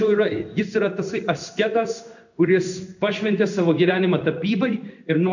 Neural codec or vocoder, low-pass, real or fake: codec, 16 kHz in and 24 kHz out, 1 kbps, XY-Tokenizer; 7.2 kHz; fake